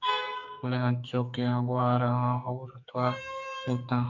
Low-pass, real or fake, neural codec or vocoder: 7.2 kHz; fake; codec, 44.1 kHz, 2.6 kbps, SNAC